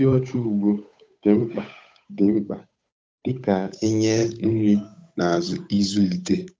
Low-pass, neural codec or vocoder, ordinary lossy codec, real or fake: none; codec, 16 kHz, 8 kbps, FunCodec, trained on Chinese and English, 25 frames a second; none; fake